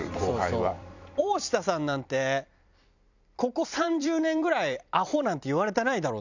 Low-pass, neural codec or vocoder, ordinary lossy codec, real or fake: 7.2 kHz; vocoder, 44.1 kHz, 128 mel bands every 256 samples, BigVGAN v2; none; fake